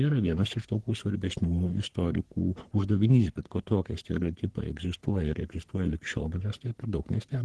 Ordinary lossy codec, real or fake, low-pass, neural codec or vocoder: Opus, 16 kbps; fake; 10.8 kHz; codec, 44.1 kHz, 3.4 kbps, Pupu-Codec